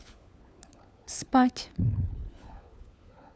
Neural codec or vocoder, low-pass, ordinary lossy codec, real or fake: codec, 16 kHz, 4 kbps, FunCodec, trained on LibriTTS, 50 frames a second; none; none; fake